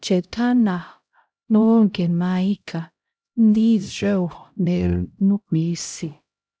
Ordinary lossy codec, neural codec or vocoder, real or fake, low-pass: none; codec, 16 kHz, 0.5 kbps, X-Codec, HuBERT features, trained on LibriSpeech; fake; none